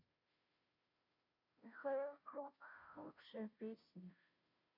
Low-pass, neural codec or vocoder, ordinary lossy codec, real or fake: 5.4 kHz; codec, 16 kHz, 0.5 kbps, FunCodec, trained on Chinese and English, 25 frames a second; none; fake